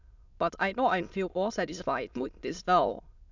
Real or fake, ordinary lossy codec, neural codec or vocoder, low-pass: fake; none; autoencoder, 22.05 kHz, a latent of 192 numbers a frame, VITS, trained on many speakers; 7.2 kHz